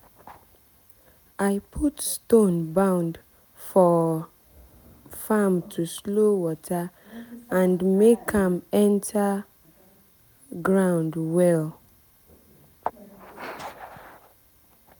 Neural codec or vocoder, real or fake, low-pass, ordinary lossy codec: none; real; none; none